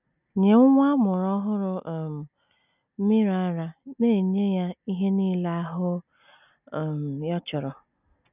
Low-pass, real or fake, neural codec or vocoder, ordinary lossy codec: 3.6 kHz; real; none; none